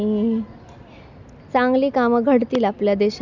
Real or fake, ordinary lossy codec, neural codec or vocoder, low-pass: real; none; none; 7.2 kHz